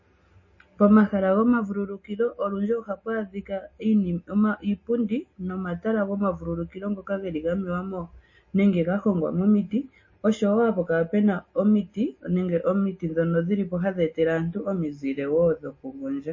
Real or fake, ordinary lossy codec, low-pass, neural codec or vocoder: real; MP3, 32 kbps; 7.2 kHz; none